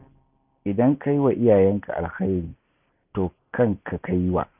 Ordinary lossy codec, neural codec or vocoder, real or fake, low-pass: none; none; real; 3.6 kHz